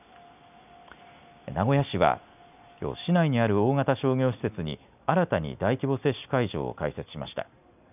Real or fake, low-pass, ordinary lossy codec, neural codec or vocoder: real; 3.6 kHz; none; none